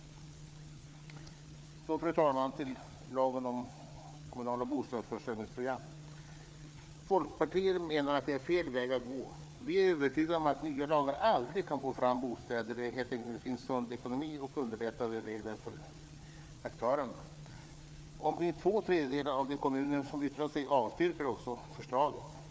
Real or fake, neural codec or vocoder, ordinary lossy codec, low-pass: fake; codec, 16 kHz, 4 kbps, FreqCodec, larger model; none; none